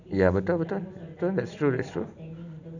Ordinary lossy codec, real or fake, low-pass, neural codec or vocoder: none; real; 7.2 kHz; none